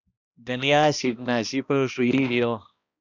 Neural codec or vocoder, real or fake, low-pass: codec, 16 kHz, 1 kbps, X-Codec, HuBERT features, trained on balanced general audio; fake; 7.2 kHz